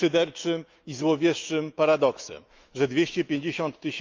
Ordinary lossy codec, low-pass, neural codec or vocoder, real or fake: Opus, 24 kbps; 7.2 kHz; autoencoder, 48 kHz, 128 numbers a frame, DAC-VAE, trained on Japanese speech; fake